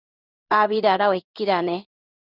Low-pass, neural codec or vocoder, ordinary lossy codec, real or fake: 5.4 kHz; codec, 16 kHz in and 24 kHz out, 1 kbps, XY-Tokenizer; Opus, 64 kbps; fake